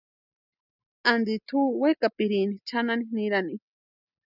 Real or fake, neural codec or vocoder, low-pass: real; none; 5.4 kHz